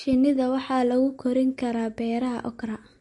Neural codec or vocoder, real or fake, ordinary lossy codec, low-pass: none; real; MP3, 48 kbps; 10.8 kHz